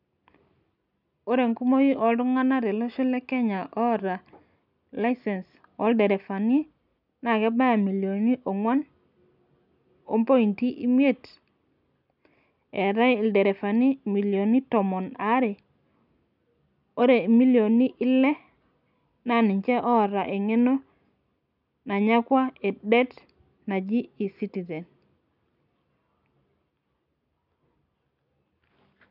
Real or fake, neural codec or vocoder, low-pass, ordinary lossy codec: real; none; 5.4 kHz; none